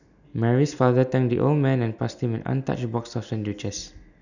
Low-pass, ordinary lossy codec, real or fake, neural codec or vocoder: 7.2 kHz; none; real; none